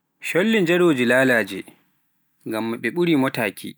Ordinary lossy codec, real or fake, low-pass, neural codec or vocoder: none; real; none; none